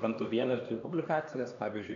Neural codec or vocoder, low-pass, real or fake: codec, 16 kHz, 2 kbps, X-Codec, HuBERT features, trained on LibriSpeech; 7.2 kHz; fake